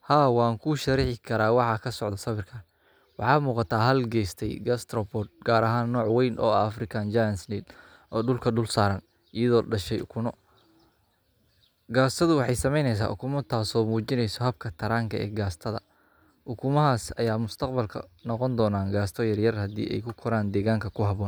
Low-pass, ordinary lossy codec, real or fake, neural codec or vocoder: none; none; real; none